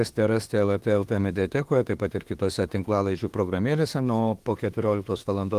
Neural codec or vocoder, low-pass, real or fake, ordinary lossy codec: autoencoder, 48 kHz, 32 numbers a frame, DAC-VAE, trained on Japanese speech; 14.4 kHz; fake; Opus, 24 kbps